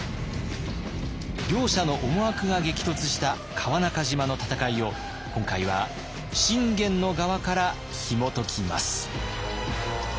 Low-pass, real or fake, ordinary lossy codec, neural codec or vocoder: none; real; none; none